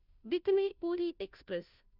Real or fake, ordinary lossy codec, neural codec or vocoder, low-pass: fake; none; codec, 16 kHz, 0.5 kbps, FunCodec, trained on Chinese and English, 25 frames a second; 5.4 kHz